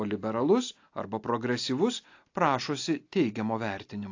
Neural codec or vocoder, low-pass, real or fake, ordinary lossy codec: none; 7.2 kHz; real; AAC, 48 kbps